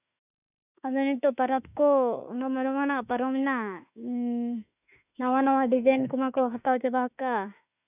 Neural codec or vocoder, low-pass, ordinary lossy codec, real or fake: autoencoder, 48 kHz, 32 numbers a frame, DAC-VAE, trained on Japanese speech; 3.6 kHz; none; fake